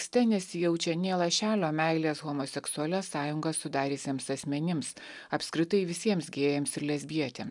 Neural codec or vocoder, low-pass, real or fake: none; 10.8 kHz; real